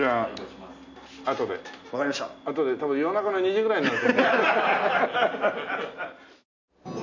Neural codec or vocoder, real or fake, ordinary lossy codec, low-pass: none; real; none; 7.2 kHz